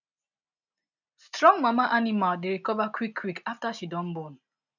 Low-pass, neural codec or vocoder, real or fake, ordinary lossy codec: 7.2 kHz; none; real; none